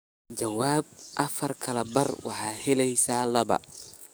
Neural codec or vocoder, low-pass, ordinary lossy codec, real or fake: codec, 44.1 kHz, 7.8 kbps, DAC; none; none; fake